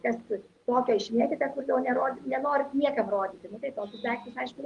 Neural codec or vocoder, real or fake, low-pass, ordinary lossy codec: none; real; 10.8 kHz; Opus, 32 kbps